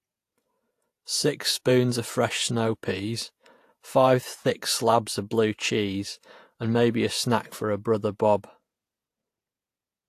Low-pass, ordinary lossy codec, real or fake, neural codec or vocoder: 14.4 kHz; AAC, 64 kbps; fake; vocoder, 48 kHz, 128 mel bands, Vocos